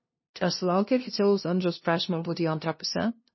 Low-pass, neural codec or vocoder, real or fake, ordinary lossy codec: 7.2 kHz; codec, 16 kHz, 0.5 kbps, FunCodec, trained on LibriTTS, 25 frames a second; fake; MP3, 24 kbps